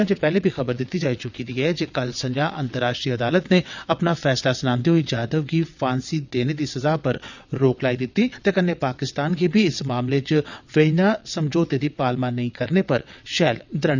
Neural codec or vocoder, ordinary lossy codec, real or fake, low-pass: vocoder, 22.05 kHz, 80 mel bands, WaveNeXt; none; fake; 7.2 kHz